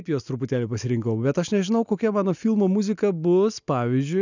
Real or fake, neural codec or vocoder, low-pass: real; none; 7.2 kHz